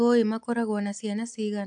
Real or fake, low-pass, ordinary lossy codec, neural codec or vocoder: real; 9.9 kHz; AAC, 64 kbps; none